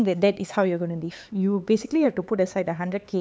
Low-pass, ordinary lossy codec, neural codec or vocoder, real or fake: none; none; codec, 16 kHz, 4 kbps, X-Codec, HuBERT features, trained on LibriSpeech; fake